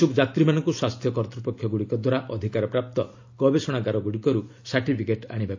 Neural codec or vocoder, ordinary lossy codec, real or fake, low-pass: none; AAC, 48 kbps; real; 7.2 kHz